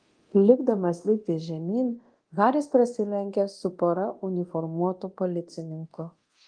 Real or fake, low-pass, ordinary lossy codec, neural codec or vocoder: fake; 9.9 kHz; Opus, 24 kbps; codec, 24 kHz, 0.9 kbps, DualCodec